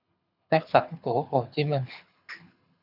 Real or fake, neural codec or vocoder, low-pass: fake; codec, 24 kHz, 6 kbps, HILCodec; 5.4 kHz